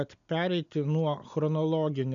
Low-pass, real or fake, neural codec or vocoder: 7.2 kHz; real; none